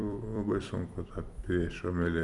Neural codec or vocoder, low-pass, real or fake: vocoder, 44.1 kHz, 128 mel bands every 256 samples, BigVGAN v2; 10.8 kHz; fake